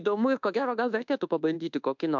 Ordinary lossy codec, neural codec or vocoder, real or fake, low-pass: MP3, 64 kbps; codec, 24 kHz, 1.2 kbps, DualCodec; fake; 7.2 kHz